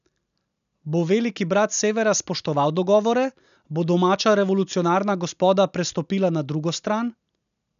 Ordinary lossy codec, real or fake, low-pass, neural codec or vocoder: none; real; 7.2 kHz; none